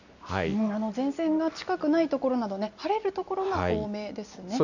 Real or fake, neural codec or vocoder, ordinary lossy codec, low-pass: real; none; none; 7.2 kHz